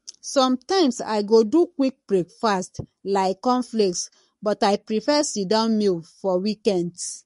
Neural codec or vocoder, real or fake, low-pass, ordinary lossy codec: codec, 44.1 kHz, 7.8 kbps, Pupu-Codec; fake; 14.4 kHz; MP3, 48 kbps